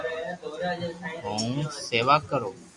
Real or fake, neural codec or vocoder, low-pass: real; none; 10.8 kHz